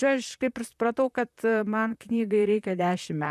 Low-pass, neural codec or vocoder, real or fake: 14.4 kHz; vocoder, 44.1 kHz, 128 mel bands, Pupu-Vocoder; fake